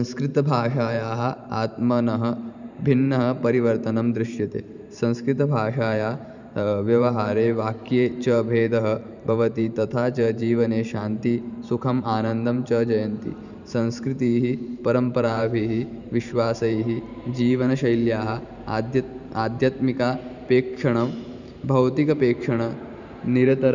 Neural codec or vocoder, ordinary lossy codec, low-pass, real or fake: vocoder, 44.1 kHz, 128 mel bands every 512 samples, BigVGAN v2; none; 7.2 kHz; fake